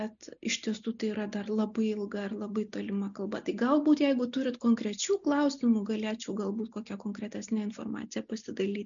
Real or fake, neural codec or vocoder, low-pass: real; none; 7.2 kHz